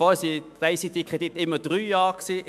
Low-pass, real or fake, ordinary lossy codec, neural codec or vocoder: 14.4 kHz; fake; MP3, 96 kbps; autoencoder, 48 kHz, 128 numbers a frame, DAC-VAE, trained on Japanese speech